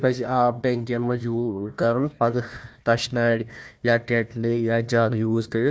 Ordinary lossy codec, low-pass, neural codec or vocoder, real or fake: none; none; codec, 16 kHz, 1 kbps, FunCodec, trained on Chinese and English, 50 frames a second; fake